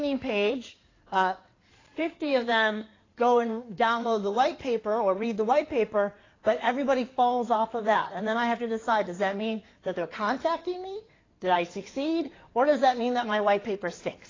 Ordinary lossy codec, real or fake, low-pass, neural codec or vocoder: AAC, 32 kbps; fake; 7.2 kHz; codec, 16 kHz in and 24 kHz out, 2.2 kbps, FireRedTTS-2 codec